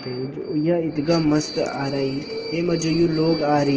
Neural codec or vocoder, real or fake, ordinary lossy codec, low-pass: none; real; Opus, 16 kbps; 7.2 kHz